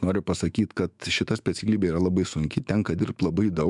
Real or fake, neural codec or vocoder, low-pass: fake; autoencoder, 48 kHz, 128 numbers a frame, DAC-VAE, trained on Japanese speech; 10.8 kHz